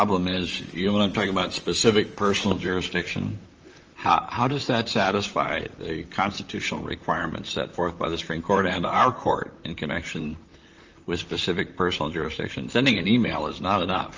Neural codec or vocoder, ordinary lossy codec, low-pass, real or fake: vocoder, 44.1 kHz, 128 mel bands, Pupu-Vocoder; Opus, 24 kbps; 7.2 kHz; fake